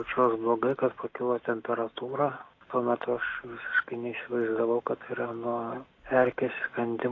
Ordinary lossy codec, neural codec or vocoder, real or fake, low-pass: AAC, 32 kbps; none; real; 7.2 kHz